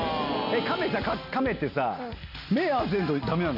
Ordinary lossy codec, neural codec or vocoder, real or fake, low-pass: none; none; real; 5.4 kHz